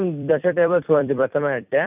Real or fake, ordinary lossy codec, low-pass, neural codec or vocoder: fake; none; 3.6 kHz; vocoder, 44.1 kHz, 128 mel bands every 256 samples, BigVGAN v2